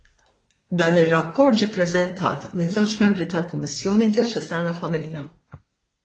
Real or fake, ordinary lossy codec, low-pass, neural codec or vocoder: fake; AAC, 32 kbps; 9.9 kHz; codec, 24 kHz, 1 kbps, SNAC